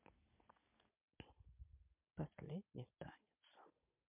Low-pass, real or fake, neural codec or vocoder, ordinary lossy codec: 3.6 kHz; real; none; MP3, 32 kbps